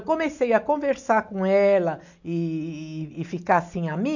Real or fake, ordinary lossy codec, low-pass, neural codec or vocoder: real; none; 7.2 kHz; none